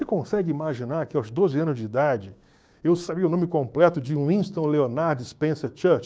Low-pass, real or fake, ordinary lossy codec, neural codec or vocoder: none; fake; none; codec, 16 kHz, 6 kbps, DAC